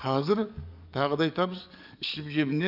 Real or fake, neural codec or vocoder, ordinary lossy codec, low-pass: fake; codec, 44.1 kHz, 7.8 kbps, DAC; none; 5.4 kHz